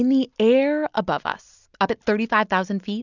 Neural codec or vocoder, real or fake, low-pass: none; real; 7.2 kHz